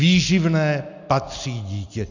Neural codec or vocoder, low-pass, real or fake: none; 7.2 kHz; real